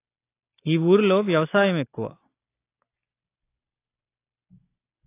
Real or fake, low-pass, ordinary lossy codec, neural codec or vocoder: real; 3.6 kHz; AAC, 24 kbps; none